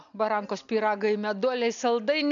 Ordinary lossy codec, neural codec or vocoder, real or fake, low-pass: MP3, 96 kbps; none; real; 7.2 kHz